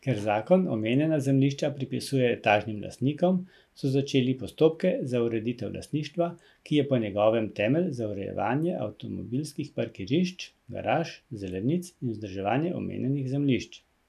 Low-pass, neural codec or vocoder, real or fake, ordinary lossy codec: 14.4 kHz; none; real; none